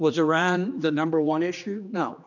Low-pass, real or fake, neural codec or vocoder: 7.2 kHz; fake; codec, 16 kHz, 2 kbps, X-Codec, HuBERT features, trained on general audio